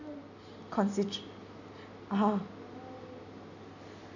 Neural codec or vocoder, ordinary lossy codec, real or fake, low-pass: none; none; real; 7.2 kHz